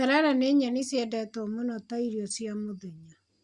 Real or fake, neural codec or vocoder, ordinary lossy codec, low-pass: real; none; Opus, 64 kbps; 10.8 kHz